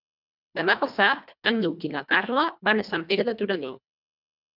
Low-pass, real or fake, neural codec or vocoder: 5.4 kHz; fake; codec, 24 kHz, 1.5 kbps, HILCodec